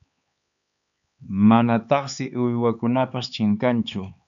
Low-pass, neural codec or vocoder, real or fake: 7.2 kHz; codec, 16 kHz, 4 kbps, X-Codec, HuBERT features, trained on LibriSpeech; fake